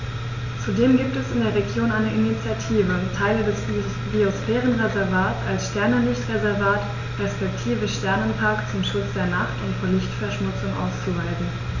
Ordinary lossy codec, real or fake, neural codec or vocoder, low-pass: none; real; none; 7.2 kHz